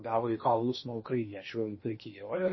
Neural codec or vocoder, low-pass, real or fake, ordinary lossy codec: codec, 16 kHz in and 24 kHz out, 0.8 kbps, FocalCodec, streaming, 65536 codes; 7.2 kHz; fake; MP3, 24 kbps